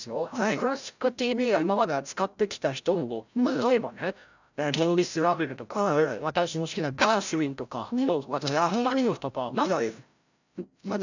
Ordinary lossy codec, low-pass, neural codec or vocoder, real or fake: none; 7.2 kHz; codec, 16 kHz, 0.5 kbps, FreqCodec, larger model; fake